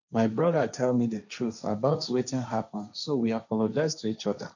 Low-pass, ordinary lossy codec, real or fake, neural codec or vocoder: 7.2 kHz; none; fake; codec, 16 kHz, 1.1 kbps, Voila-Tokenizer